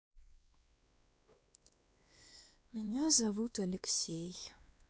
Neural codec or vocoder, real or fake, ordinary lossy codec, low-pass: codec, 16 kHz, 2 kbps, X-Codec, WavLM features, trained on Multilingual LibriSpeech; fake; none; none